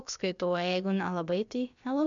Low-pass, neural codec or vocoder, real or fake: 7.2 kHz; codec, 16 kHz, about 1 kbps, DyCAST, with the encoder's durations; fake